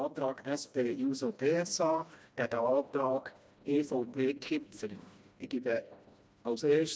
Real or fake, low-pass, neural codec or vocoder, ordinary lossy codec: fake; none; codec, 16 kHz, 1 kbps, FreqCodec, smaller model; none